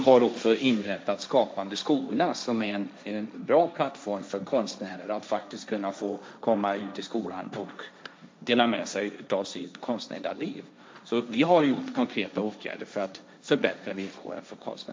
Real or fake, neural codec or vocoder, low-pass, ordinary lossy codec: fake; codec, 16 kHz, 1.1 kbps, Voila-Tokenizer; none; none